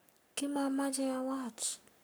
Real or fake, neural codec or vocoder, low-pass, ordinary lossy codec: fake; codec, 44.1 kHz, 7.8 kbps, Pupu-Codec; none; none